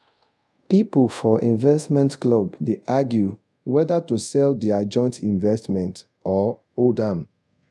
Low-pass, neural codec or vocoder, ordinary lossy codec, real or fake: none; codec, 24 kHz, 0.5 kbps, DualCodec; none; fake